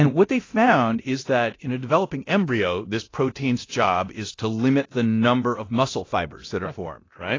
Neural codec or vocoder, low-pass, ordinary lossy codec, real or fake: codec, 24 kHz, 0.9 kbps, DualCodec; 7.2 kHz; AAC, 32 kbps; fake